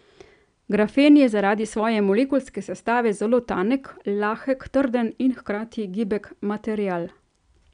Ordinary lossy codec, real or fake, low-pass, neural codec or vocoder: none; real; 9.9 kHz; none